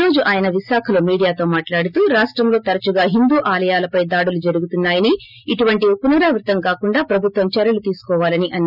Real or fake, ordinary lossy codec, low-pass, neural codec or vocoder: real; none; 5.4 kHz; none